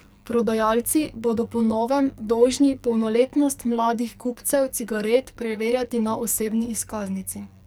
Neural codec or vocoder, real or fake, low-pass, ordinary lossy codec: codec, 44.1 kHz, 2.6 kbps, SNAC; fake; none; none